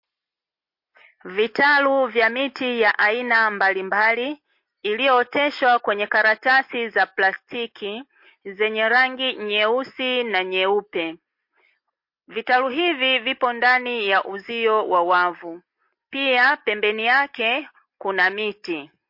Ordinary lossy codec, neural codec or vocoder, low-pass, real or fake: MP3, 32 kbps; none; 5.4 kHz; real